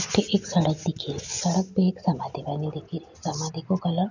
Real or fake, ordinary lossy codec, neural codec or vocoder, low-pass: real; none; none; 7.2 kHz